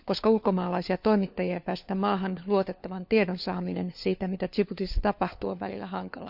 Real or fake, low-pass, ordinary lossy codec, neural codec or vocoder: fake; 5.4 kHz; none; codec, 16 kHz, 4 kbps, FunCodec, trained on LibriTTS, 50 frames a second